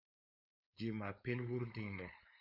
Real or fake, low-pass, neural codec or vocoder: fake; 5.4 kHz; codec, 16 kHz, 4.8 kbps, FACodec